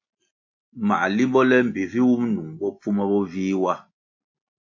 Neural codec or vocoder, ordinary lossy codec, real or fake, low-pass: none; AAC, 48 kbps; real; 7.2 kHz